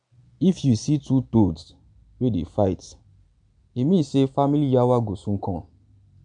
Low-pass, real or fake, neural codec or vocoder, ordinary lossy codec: 9.9 kHz; real; none; none